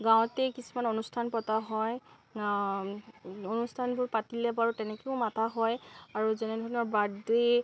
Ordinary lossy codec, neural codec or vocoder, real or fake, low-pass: none; none; real; none